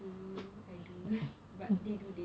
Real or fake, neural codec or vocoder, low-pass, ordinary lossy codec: real; none; none; none